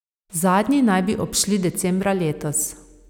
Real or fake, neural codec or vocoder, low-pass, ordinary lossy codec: real; none; 19.8 kHz; none